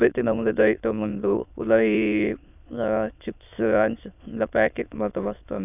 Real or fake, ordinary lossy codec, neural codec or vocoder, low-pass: fake; none; autoencoder, 22.05 kHz, a latent of 192 numbers a frame, VITS, trained on many speakers; 3.6 kHz